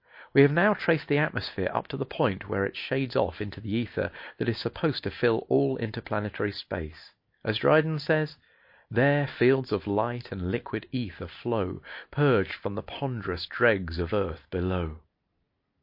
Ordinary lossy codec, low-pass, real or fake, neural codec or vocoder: MP3, 32 kbps; 5.4 kHz; real; none